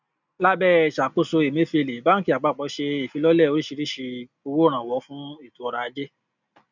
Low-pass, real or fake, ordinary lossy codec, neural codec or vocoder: 7.2 kHz; real; none; none